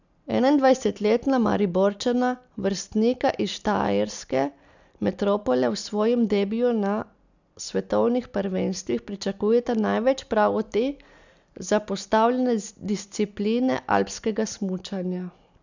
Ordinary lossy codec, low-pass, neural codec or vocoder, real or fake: none; 7.2 kHz; none; real